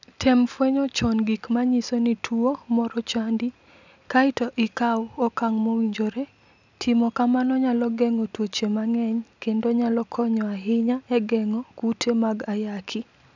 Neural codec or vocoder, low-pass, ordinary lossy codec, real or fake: none; 7.2 kHz; none; real